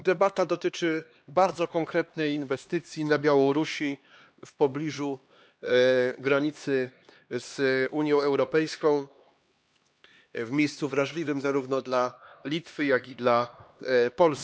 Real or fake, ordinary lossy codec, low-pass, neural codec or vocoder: fake; none; none; codec, 16 kHz, 2 kbps, X-Codec, HuBERT features, trained on LibriSpeech